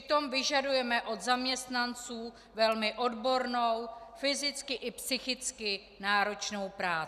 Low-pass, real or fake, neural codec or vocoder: 14.4 kHz; real; none